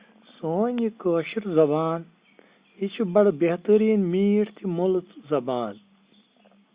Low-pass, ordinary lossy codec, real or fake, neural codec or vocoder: 3.6 kHz; Opus, 64 kbps; real; none